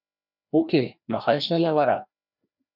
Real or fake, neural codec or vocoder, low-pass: fake; codec, 16 kHz, 1 kbps, FreqCodec, larger model; 5.4 kHz